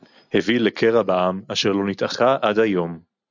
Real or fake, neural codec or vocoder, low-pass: real; none; 7.2 kHz